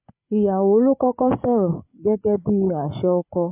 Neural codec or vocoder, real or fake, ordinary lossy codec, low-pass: codec, 16 kHz, 8 kbps, FreqCodec, larger model; fake; MP3, 32 kbps; 3.6 kHz